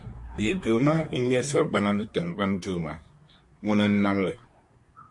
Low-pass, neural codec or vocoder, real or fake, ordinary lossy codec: 10.8 kHz; codec, 24 kHz, 1 kbps, SNAC; fake; MP3, 48 kbps